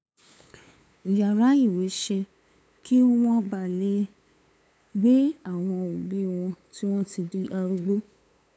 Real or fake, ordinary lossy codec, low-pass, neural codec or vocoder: fake; none; none; codec, 16 kHz, 8 kbps, FunCodec, trained on LibriTTS, 25 frames a second